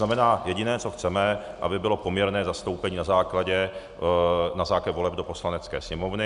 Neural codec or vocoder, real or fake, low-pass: none; real; 10.8 kHz